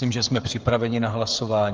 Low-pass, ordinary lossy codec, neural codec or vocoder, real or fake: 7.2 kHz; Opus, 32 kbps; codec, 16 kHz, 16 kbps, FreqCodec, smaller model; fake